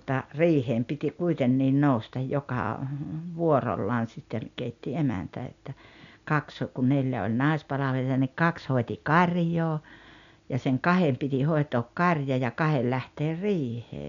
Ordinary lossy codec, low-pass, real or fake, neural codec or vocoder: none; 7.2 kHz; real; none